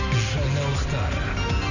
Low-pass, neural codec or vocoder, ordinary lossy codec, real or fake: 7.2 kHz; none; none; real